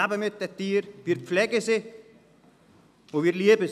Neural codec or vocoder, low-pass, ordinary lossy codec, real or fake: none; 14.4 kHz; none; real